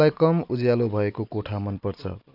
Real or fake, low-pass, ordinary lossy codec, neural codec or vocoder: real; 5.4 kHz; none; none